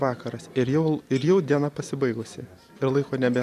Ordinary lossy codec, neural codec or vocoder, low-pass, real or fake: MP3, 96 kbps; none; 14.4 kHz; real